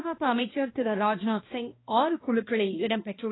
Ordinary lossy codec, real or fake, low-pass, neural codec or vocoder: AAC, 16 kbps; fake; 7.2 kHz; codec, 16 kHz, 1 kbps, X-Codec, HuBERT features, trained on balanced general audio